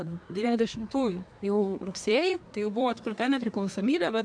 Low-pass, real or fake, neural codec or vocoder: 9.9 kHz; fake; codec, 24 kHz, 1 kbps, SNAC